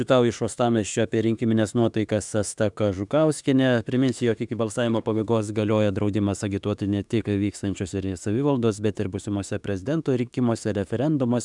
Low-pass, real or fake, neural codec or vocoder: 10.8 kHz; fake; autoencoder, 48 kHz, 32 numbers a frame, DAC-VAE, trained on Japanese speech